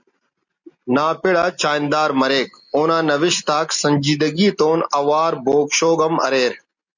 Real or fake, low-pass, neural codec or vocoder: real; 7.2 kHz; none